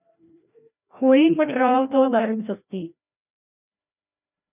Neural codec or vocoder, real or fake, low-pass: codec, 16 kHz, 1 kbps, FreqCodec, larger model; fake; 3.6 kHz